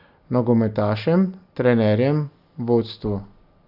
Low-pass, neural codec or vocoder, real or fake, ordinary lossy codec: 5.4 kHz; none; real; AAC, 48 kbps